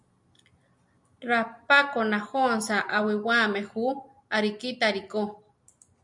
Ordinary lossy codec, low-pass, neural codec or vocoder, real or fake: MP3, 96 kbps; 10.8 kHz; none; real